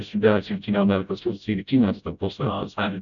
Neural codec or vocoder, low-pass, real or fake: codec, 16 kHz, 0.5 kbps, FreqCodec, smaller model; 7.2 kHz; fake